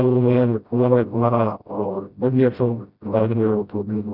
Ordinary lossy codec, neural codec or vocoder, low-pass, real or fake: none; codec, 16 kHz, 0.5 kbps, FreqCodec, smaller model; 5.4 kHz; fake